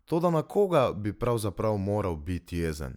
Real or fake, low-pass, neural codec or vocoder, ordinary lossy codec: real; 19.8 kHz; none; none